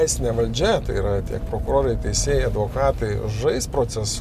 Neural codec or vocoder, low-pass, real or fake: none; 14.4 kHz; real